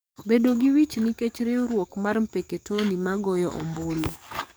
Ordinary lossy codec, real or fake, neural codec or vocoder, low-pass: none; fake; codec, 44.1 kHz, 7.8 kbps, DAC; none